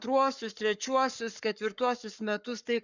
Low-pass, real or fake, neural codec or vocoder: 7.2 kHz; fake; codec, 44.1 kHz, 7.8 kbps, Pupu-Codec